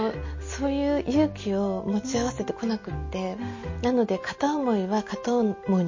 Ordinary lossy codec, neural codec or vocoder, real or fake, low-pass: MP3, 32 kbps; none; real; 7.2 kHz